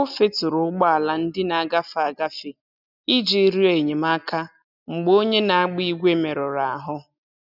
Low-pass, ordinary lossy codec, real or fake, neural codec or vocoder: 5.4 kHz; none; real; none